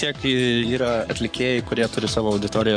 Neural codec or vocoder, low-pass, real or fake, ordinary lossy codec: codec, 44.1 kHz, 7.8 kbps, Pupu-Codec; 9.9 kHz; fake; MP3, 64 kbps